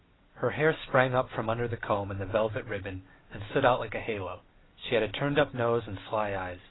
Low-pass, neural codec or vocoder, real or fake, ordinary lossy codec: 7.2 kHz; none; real; AAC, 16 kbps